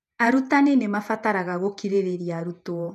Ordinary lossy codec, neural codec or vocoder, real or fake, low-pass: none; vocoder, 48 kHz, 128 mel bands, Vocos; fake; 14.4 kHz